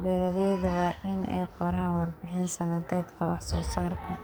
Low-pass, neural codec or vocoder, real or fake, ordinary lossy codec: none; codec, 44.1 kHz, 2.6 kbps, SNAC; fake; none